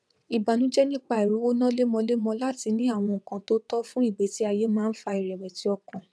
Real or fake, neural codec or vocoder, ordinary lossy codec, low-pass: fake; vocoder, 22.05 kHz, 80 mel bands, WaveNeXt; none; none